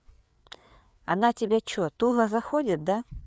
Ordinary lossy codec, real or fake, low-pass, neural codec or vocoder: none; fake; none; codec, 16 kHz, 4 kbps, FreqCodec, larger model